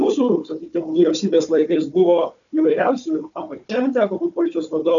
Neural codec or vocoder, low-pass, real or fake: codec, 16 kHz, 4 kbps, FunCodec, trained on Chinese and English, 50 frames a second; 7.2 kHz; fake